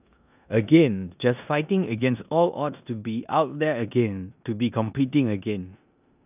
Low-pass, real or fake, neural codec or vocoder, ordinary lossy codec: 3.6 kHz; fake; codec, 16 kHz in and 24 kHz out, 0.9 kbps, LongCat-Audio-Codec, four codebook decoder; none